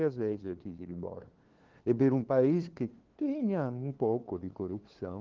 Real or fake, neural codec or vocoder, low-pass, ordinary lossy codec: fake; codec, 16 kHz, 2 kbps, FunCodec, trained on LibriTTS, 25 frames a second; 7.2 kHz; Opus, 16 kbps